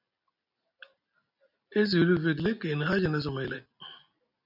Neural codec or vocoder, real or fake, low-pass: none; real; 5.4 kHz